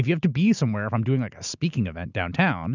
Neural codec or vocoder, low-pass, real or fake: none; 7.2 kHz; real